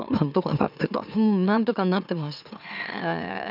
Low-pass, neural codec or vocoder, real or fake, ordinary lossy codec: 5.4 kHz; autoencoder, 44.1 kHz, a latent of 192 numbers a frame, MeloTTS; fake; none